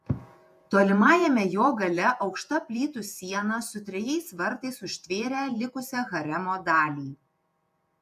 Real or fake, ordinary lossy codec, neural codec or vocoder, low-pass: fake; AAC, 96 kbps; vocoder, 48 kHz, 128 mel bands, Vocos; 14.4 kHz